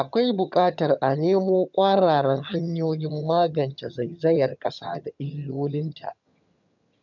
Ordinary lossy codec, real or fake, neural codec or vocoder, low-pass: none; fake; vocoder, 22.05 kHz, 80 mel bands, HiFi-GAN; 7.2 kHz